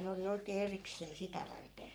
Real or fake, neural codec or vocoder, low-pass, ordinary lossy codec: fake; codec, 44.1 kHz, 3.4 kbps, Pupu-Codec; none; none